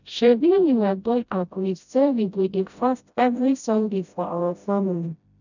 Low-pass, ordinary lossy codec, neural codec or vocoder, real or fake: 7.2 kHz; none; codec, 16 kHz, 0.5 kbps, FreqCodec, smaller model; fake